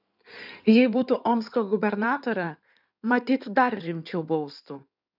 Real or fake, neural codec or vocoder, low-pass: fake; codec, 16 kHz in and 24 kHz out, 2.2 kbps, FireRedTTS-2 codec; 5.4 kHz